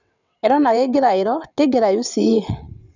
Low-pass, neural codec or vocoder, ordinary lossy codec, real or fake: 7.2 kHz; vocoder, 22.05 kHz, 80 mel bands, WaveNeXt; none; fake